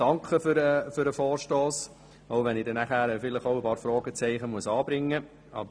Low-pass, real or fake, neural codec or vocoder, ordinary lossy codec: none; real; none; none